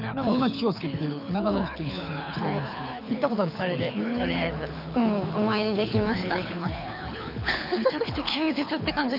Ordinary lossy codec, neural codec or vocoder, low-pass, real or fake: none; codec, 24 kHz, 6 kbps, HILCodec; 5.4 kHz; fake